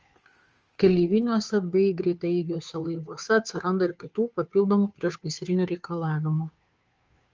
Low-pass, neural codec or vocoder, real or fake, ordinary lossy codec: 7.2 kHz; codec, 16 kHz, 2 kbps, FunCodec, trained on Chinese and English, 25 frames a second; fake; Opus, 24 kbps